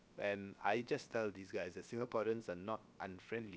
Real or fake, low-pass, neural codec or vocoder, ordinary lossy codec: fake; none; codec, 16 kHz, about 1 kbps, DyCAST, with the encoder's durations; none